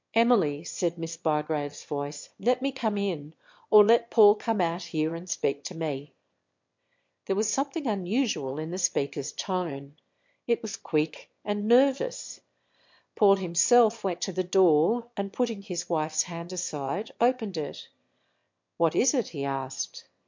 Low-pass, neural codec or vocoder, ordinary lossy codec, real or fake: 7.2 kHz; autoencoder, 22.05 kHz, a latent of 192 numbers a frame, VITS, trained on one speaker; MP3, 48 kbps; fake